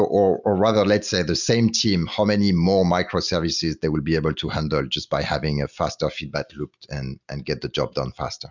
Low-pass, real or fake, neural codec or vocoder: 7.2 kHz; real; none